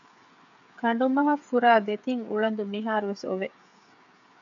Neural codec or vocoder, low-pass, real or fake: codec, 16 kHz, 8 kbps, FreqCodec, smaller model; 7.2 kHz; fake